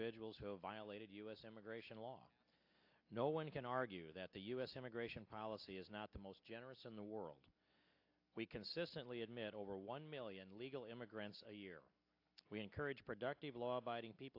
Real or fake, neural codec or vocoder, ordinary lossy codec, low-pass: real; none; AAC, 48 kbps; 5.4 kHz